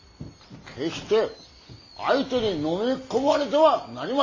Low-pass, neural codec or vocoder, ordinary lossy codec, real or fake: 7.2 kHz; none; MP3, 32 kbps; real